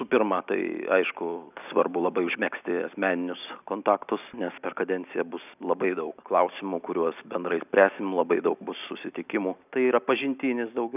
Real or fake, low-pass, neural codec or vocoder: real; 3.6 kHz; none